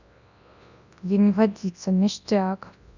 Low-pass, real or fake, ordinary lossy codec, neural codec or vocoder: 7.2 kHz; fake; none; codec, 24 kHz, 0.9 kbps, WavTokenizer, large speech release